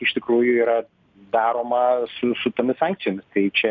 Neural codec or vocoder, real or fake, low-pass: none; real; 7.2 kHz